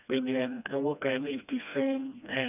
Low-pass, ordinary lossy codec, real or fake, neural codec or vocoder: 3.6 kHz; none; fake; codec, 16 kHz, 1 kbps, FreqCodec, smaller model